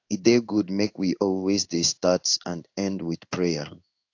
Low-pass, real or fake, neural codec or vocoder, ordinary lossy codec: 7.2 kHz; fake; codec, 16 kHz in and 24 kHz out, 1 kbps, XY-Tokenizer; AAC, 48 kbps